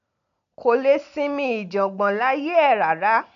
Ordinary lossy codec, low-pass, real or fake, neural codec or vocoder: none; 7.2 kHz; real; none